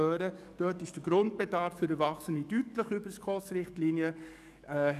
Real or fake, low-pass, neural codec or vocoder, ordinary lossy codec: fake; 14.4 kHz; codec, 44.1 kHz, 7.8 kbps, DAC; none